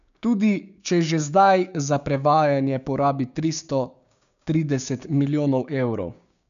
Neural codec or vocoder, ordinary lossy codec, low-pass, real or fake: codec, 16 kHz, 6 kbps, DAC; none; 7.2 kHz; fake